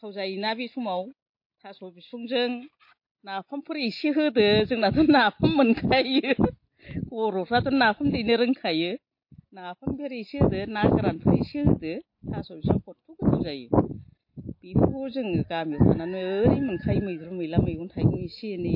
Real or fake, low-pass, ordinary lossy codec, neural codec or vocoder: real; 5.4 kHz; MP3, 32 kbps; none